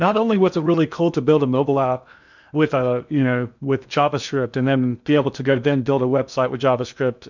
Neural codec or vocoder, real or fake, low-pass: codec, 16 kHz in and 24 kHz out, 0.8 kbps, FocalCodec, streaming, 65536 codes; fake; 7.2 kHz